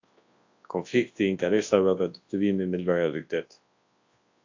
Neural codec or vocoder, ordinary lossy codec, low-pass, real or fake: codec, 24 kHz, 0.9 kbps, WavTokenizer, large speech release; AAC, 48 kbps; 7.2 kHz; fake